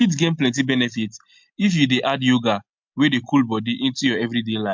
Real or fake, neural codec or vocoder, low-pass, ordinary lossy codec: real; none; 7.2 kHz; MP3, 64 kbps